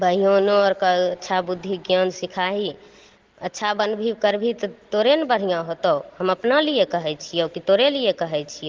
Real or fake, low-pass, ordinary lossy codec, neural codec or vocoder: real; 7.2 kHz; Opus, 16 kbps; none